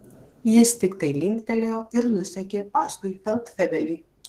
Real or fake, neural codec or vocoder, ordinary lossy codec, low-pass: fake; codec, 32 kHz, 1.9 kbps, SNAC; Opus, 16 kbps; 14.4 kHz